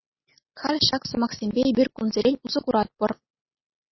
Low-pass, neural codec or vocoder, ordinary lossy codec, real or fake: 7.2 kHz; none; MP3, 24 kbps; real